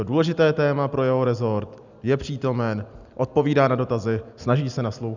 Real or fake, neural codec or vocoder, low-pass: real; none; 7.2 kHz